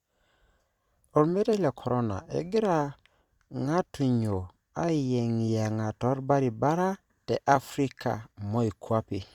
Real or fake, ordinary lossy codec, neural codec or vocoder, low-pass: fake; Opus, 64 kbps; vocoder, 44.1 kHz, 128 mel bands every 256 samples, BigVGAN v2; 19.8 kHz